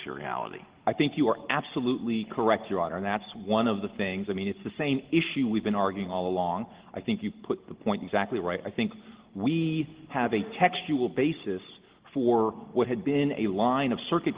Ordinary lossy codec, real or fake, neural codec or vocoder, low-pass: Opus, 16 kbps; real; none; 3.6 kHz